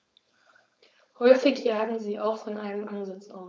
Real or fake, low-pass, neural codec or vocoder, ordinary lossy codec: fake; none; codec, 16 kHz, 4.8 kbps, FACodec; none